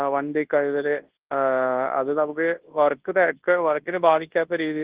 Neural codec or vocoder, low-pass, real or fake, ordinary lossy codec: codec, 24 kHz, 0.9 kbps, WavTokenizer, large speech release; 3.6 kHz; fake; Opus, 16 kbps